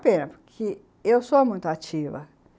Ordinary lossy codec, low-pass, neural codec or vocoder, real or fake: none; none; none; real